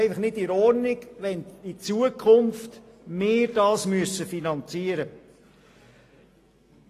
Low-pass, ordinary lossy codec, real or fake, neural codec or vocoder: 14.4 kHz; AAC, 48 kbps; real; none